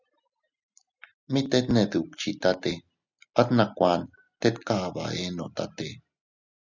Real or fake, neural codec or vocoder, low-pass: real; none; 7.2 kHz